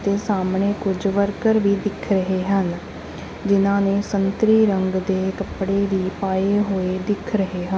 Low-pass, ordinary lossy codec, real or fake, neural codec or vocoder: none; none; real; none